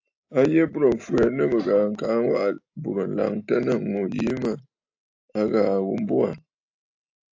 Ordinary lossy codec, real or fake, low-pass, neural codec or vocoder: AAC, 48 kbps; real; 7.2 kHz; none